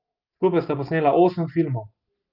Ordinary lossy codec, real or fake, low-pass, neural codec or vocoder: Opus, 24 kbps; real; 5.4 kHz; none